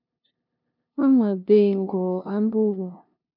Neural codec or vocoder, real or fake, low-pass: codec, 16 kHz, 0.5 kbps, FunCodec, trained on LibriTTS, 25 frames a second; fake; 5.4 kHz